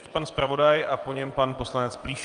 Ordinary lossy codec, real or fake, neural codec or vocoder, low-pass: Opus, 32 kbps; fake; vocoder, 22.05 kHz, 80 mel bands, WaveNeXt; 9.9 kHz